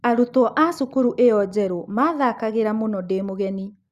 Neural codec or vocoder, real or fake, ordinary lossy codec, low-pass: none; real; Opus, 64 kbps; 14.4 kHz